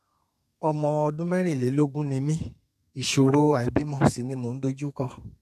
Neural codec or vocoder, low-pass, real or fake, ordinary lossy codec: codec, 32 kHz, 1.9 kbps, SNAC; 14.4 kHz; fake; none